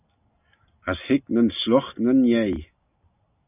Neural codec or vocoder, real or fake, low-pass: none; real; 3.6 kHz